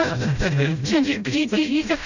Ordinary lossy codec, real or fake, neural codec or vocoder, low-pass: none; fake; codec, 16 kHz, 0.5 kbps, FreqCodec, smaller model; 7.2 kHz